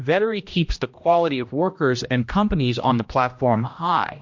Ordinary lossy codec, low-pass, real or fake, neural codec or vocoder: MP3, 48 kbps; 7.2 kHz; fake; codec, 16 kHz, 1 kbps, X-Codec, HuBERT features, trained on general audio